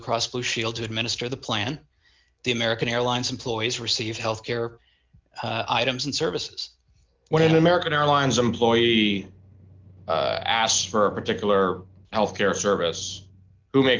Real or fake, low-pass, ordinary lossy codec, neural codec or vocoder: real; 7.2 kHz; Opus, 32 kbps; none